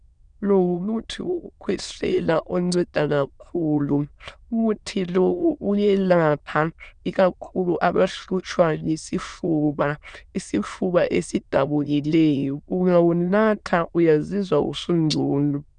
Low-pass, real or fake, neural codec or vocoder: 9.9 kHz; fake; autoencoder, 22.05 kHz, a latent of 192 numbers a frame, VITS, trained on many speakers